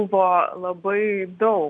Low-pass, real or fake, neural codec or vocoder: 9.9 kHz; real; none